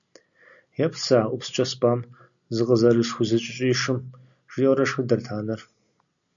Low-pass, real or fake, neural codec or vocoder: 7.2 kHz; real; none